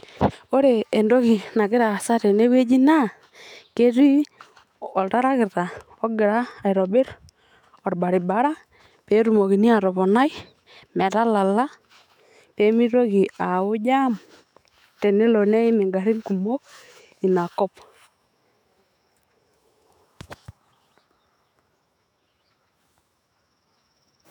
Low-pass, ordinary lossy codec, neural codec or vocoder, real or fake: 19.8 kHz; none; autoencoder, 48 kHz, 128 numbers a frame, DAC-VAE, trained on Japanese speech; fake